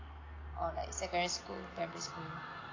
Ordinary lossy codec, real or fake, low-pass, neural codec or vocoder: AAC, 32 kbps; real; 7.2 kHz; none